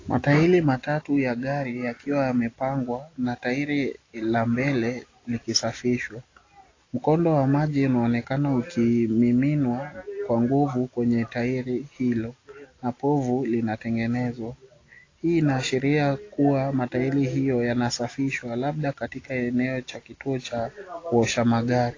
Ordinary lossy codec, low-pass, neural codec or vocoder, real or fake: AAC, 32 kbps; 7.2 kHz; none; real